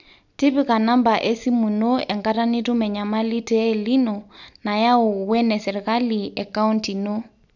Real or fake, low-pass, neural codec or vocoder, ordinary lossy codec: real; 7.2 kHz; none; none